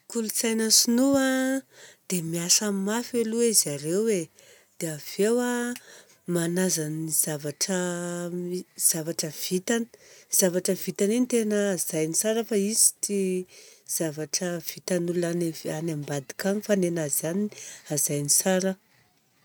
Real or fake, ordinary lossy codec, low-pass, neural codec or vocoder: real; none; none; none